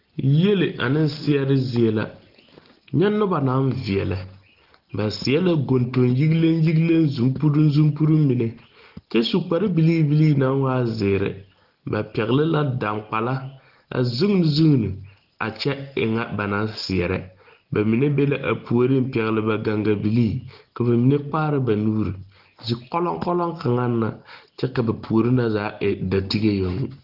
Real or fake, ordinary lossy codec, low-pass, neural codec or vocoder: real; Opus, 16 kbps; 5.4 kHz; none